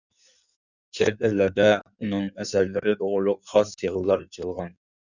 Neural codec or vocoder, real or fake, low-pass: codec, 16 kHz in and 24 kHz out, 1.1 kbps, FireRedTTS-2 codec; fake; 7.2 kHz